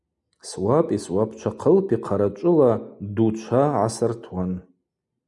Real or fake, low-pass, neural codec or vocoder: real; 10.8 kHz; none